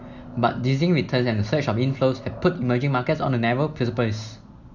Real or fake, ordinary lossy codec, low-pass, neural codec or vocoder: real; none; 7.2 kHz; none